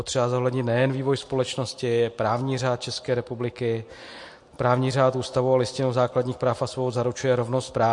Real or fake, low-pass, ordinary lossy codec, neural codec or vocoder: real; 10.8 kHz; MP3, 48 kbps; none